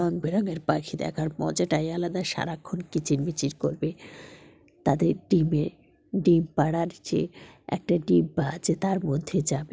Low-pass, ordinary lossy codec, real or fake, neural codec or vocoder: none; none; real; none